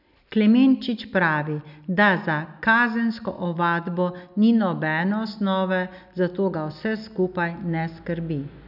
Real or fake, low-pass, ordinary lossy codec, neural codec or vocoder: real; 5.4 kHz; none; none